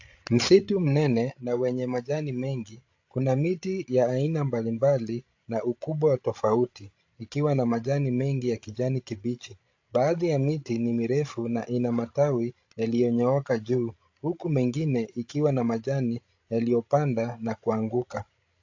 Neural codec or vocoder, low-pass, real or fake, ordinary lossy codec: codec, 16 kHz, 16 kbps, FreqCodec, larger model; 7.2 kHz; fake; AAC, 48 kbps